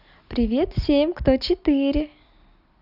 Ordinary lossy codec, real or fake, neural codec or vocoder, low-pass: none; real; none; 5.4 kHz